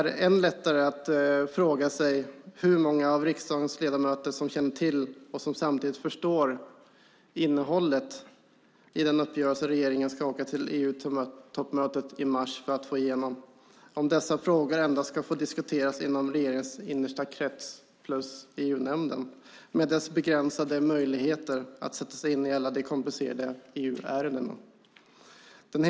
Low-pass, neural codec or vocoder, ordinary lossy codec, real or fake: none; none; none; real